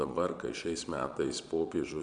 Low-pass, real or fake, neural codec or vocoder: 9.9 kHz; fake; vocoder, 22.05 kHz, 80 mel bands, Vocos